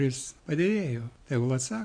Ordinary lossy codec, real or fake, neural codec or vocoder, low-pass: MP3, 48 kbps; real; none; 9.9 kHz